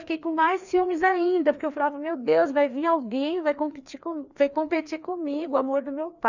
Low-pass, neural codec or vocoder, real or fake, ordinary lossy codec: 7.2 kHz; codec, 16 kHz, 2 kbps, FreqCodec, larger model; fake; none